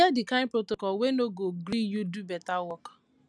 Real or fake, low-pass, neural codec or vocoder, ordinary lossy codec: real; 9.9 kHz; none; none